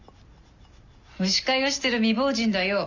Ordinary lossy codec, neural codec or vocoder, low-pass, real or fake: none; none; 7.2 kHz; real